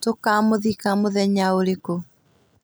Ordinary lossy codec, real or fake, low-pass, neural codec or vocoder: none; real; none; none